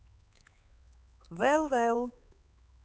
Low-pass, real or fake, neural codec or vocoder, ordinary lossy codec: none; fake; codec, 16 kHz, 4 kbps, X-Codec, HuBERT features, trained on LibriSpeech; none